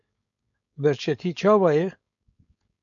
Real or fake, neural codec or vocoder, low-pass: fake; codec, 16 kHz, 4.8 kbps, FACodec; 7.2 kHz